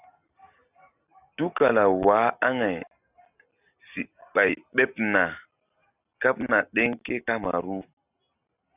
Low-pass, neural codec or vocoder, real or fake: 3.6 kHz; none; real